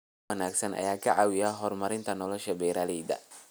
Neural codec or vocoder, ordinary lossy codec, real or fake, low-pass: none; none; real; none